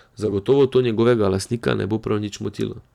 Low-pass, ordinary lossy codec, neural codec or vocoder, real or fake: 19.8 kHz; none; vocoder, 44.1 kHz, 128 mel bands every 256 samples, BigVGAN v2; fake